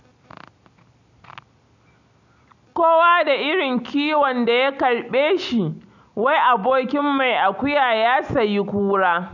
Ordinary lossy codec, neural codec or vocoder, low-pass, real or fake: none; none; 7.2 kHz; real